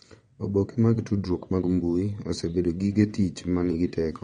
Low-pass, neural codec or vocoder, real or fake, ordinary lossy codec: 9.9 kHz; vocoder, 22.05 kHz, 80 mel bands, WaveNeXt; fake; MP3, 48 kbps